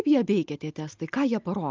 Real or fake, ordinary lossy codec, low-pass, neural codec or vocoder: real; Opus, 24 kbps; 7.2 kHz; none